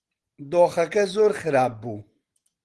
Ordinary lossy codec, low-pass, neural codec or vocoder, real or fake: Opus, 16 kbps; 10.8 kHz; none; real